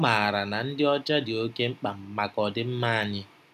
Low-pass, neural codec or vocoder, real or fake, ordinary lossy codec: 14.4 kHz; none; real; none